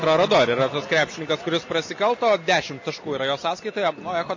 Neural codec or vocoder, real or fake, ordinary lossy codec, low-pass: none; real; MP3, 32 kbps; 7.2 kHz